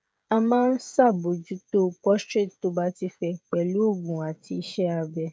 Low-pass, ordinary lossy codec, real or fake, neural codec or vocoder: none; none; fake; codec, 16 kHz, 16 kbps, FreqCodec, smaller model